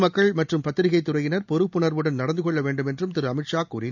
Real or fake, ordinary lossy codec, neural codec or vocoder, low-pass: real; none; none; 7.2 kHz